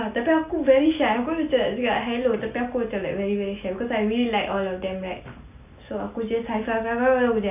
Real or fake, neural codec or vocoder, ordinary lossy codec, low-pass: real; none; none; 3.6 kHz